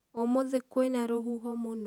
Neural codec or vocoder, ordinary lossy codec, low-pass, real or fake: vocoder, 48 kHz, 128 mel bands, Vocos; none; 19.8 kHz; fake